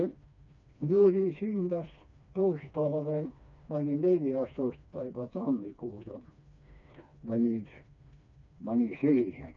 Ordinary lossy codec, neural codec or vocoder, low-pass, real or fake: none; codec, 16 kHz, 2 kbps, FreqCodec, smaller model; 7.2 kHz; fake